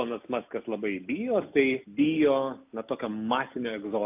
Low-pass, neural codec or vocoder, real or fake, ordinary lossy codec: 3.6 kHz; none; real; AAC, 32 kbps